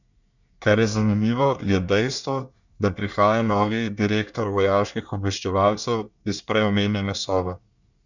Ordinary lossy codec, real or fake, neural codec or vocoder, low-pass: none; fake; codec, 24 kHz, 1 kbps, SNAC; 7.2 kHz